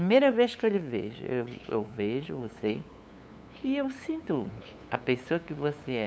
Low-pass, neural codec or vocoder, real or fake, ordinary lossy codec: none; codec, 16 kHz, 8 kbps, FunCodec, trained on LibriTTS, 25 frames a second; fake; none